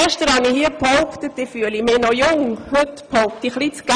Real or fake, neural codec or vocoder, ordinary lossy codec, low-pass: real; none; Opus, 64 kbps; 9.9 kHz